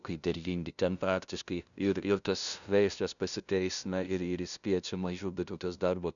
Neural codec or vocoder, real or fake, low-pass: codec, 16 kHz, 0.5 kbps, FunCodec, trained on LibriTTS, 25 frames a second; fake; 7.2 kHz